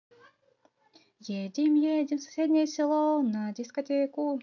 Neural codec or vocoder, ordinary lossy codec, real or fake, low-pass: none; AAC, 48 kbps; real; 7.2 kHz